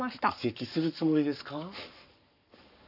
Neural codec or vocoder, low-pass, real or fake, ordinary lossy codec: codec, 44.1 kHz, 7.8 kbps, Pupu-Codec; 5.4 kHz; fake; none